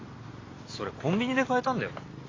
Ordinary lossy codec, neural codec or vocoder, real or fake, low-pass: AAC, 32 kbps; none; real; 7.2 kHz